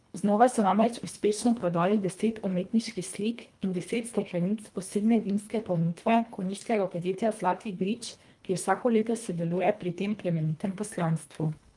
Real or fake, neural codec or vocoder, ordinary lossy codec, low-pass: fake; codec, 24 kHz, 1.5 kbps, HILCodec; Opus, 32 kbps; 10.8 kHz